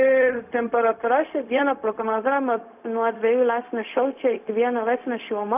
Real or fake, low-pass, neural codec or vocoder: fake; 3.6 kHz; codec, 16 kHz, 0.4 kbps, LongCat-Audio-Codec